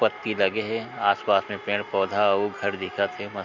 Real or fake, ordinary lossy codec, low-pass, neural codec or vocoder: real; none; 7.2 kHz; none